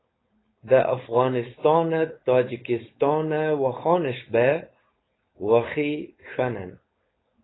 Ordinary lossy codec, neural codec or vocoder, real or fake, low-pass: AAC, 16 kbps; codec, 16 kHz, 4.8 kbps, FACodec; fake; 7.2 kHz